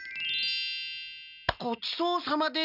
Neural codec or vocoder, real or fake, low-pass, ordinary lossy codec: none; real; 5.4 kHz; none